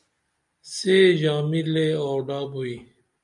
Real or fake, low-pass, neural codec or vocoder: real; 10.8 kHz; none